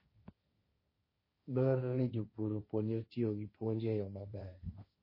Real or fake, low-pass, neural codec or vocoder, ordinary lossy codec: fake; 5.4 kHz; codec, 16 kHz, 1.1 kbps, Voila-Tokenizer; MP3, 24 kbps